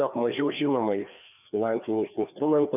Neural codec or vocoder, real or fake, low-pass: codec, 16 kHz, 2 kbps, FreqCodec, larger model; fake; 3.6 kHz